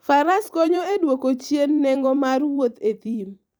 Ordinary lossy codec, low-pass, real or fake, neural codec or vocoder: none; none; fake; vocoder, 44.1 kHz, 128 mel bands every 256 samples, BigVGAN v2